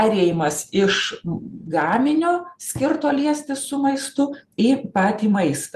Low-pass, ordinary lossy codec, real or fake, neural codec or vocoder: 14.4 kHz; Opus, 32 kbps; real; none